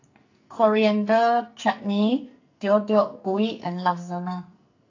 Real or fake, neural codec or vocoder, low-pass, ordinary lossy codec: fake; codec, 44.1 kHz, 2.6 kbps, SNAC; 7.2 kHz; AAC, 48 kbps